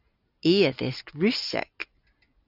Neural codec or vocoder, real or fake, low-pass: none; real; 5.4 kHz